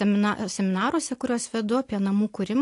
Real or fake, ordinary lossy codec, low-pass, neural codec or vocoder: real; AAC, 48 kbps; 10.8 kHz; none